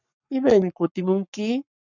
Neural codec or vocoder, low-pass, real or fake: codec, 44.1 kHz, 7.8 kbps, Pupu-Codec; 7.2 kHz; fake